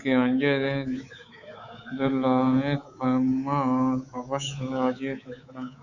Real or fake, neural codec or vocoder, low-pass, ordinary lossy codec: fake; codec, 24 kHz, 3.1 kbps, DualCodec; 7.2 kHz; Opus, 64 kbps